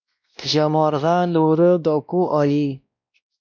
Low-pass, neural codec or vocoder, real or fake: 7.2 kHz; codec, 16 kHz, 1 kbps, X-Codec, WavLM features, trained on Multilingual LibriSpeech; fake